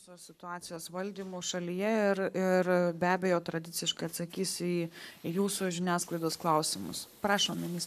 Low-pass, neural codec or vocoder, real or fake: 14.4 kHz; codec, 44.1 kHz, 7.8 kbps, Pupu-Codec; fake